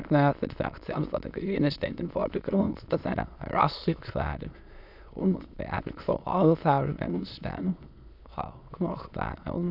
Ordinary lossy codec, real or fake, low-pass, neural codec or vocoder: none; fake; 5.4 kHz; autoencoder, 22.05 kHz, a latent of 192 numbers a frame, VITS, trained on many speakers